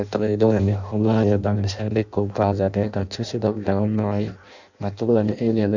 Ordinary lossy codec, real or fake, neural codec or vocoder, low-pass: none; fake; codec, 16 kHz in and 24 kHz out, 0.6 kbps, FireRedTTS-2 codec; 7.2 kHz